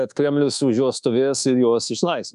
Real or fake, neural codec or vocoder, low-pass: fake; codec, 24 kHz, 1.2 kbps, DualCodec; 10.8 kHz